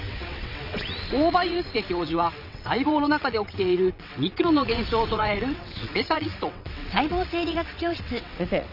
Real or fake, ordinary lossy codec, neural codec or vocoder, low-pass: fake; none; vocoder, 22.05 kHz, 80 mel bands, WaveNeXt; 5.4 kHz